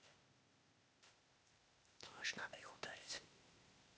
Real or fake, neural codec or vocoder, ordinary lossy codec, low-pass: fake; codec, 16 kHz, 0.8 kbps, ZipCodec; none; none